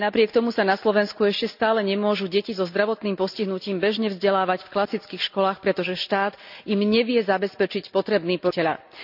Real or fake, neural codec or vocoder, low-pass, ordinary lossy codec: real; none; 5.4 kHz; none